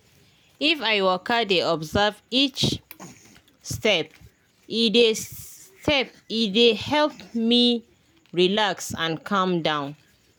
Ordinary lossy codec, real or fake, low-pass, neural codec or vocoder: none; real; none; none